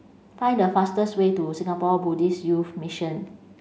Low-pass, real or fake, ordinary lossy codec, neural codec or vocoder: none; real; none; none